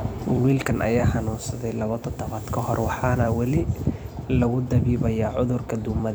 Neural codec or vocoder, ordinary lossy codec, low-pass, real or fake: vocoder, 44.1 kHz, 128 mel bands every 256 samples, BigVGAN v2; none; none; fake